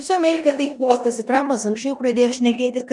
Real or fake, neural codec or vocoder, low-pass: fake; codec, 16 kHz in and 24 kHz out, 0.9 kbps, LongCat-Audio-Codec, four codebook decoder; 10.8 kHz